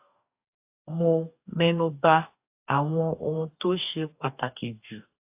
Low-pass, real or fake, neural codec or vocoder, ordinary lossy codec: 3.6 kHz; fake; codec, 44.1 kHz, 2.6 kbps, DAC; AAC, 32 kbps